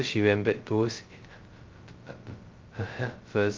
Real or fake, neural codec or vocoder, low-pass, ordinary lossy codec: fake; codec, 16 kHz, 0.2 kbps, FocalCodec; 7.2 kHz; Opus, 24 kbps